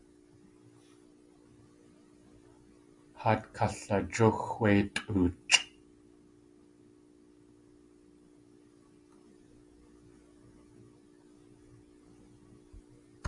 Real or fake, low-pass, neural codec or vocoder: real; 10.8 kHz; none